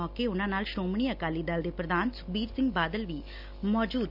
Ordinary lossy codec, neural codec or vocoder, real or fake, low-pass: none; none; real; 5.4 kHz